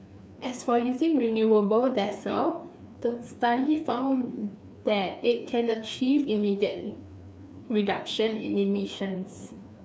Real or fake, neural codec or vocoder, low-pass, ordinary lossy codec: fake; codec, 16 kHz, 2 kbps, FreqCodec, larger model; none; none